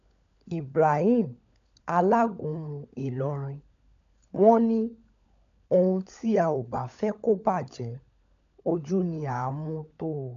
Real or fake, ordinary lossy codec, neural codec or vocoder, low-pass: fake; MP3, 96 kbps; codec, 16 kHz, 16 kbps, FunCodec, trained on LibriTTS, 50 frames a second; 7.2 kHz